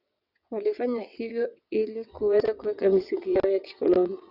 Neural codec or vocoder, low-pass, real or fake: vocoder, 22.05 kHz, 80 mel bands, WaveNeXt; 5.4 kHz; fake